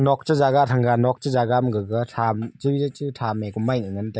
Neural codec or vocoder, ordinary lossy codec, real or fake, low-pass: none; none; real; none